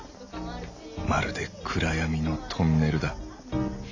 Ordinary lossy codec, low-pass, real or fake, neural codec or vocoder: AAC, 48 kbps; 7.2 kHz; real; none